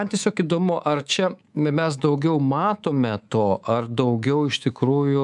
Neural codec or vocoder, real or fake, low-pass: codec, 24 kHz, 3.1 kbps, DualCodec; fake; 10.8 kHz